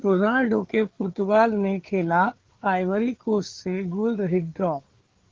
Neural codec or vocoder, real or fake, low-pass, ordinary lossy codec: codec, 16 kHz, 4 kbps, FreqCodec, larger model; fake; 7.2 kHz; Opus, 16 kbps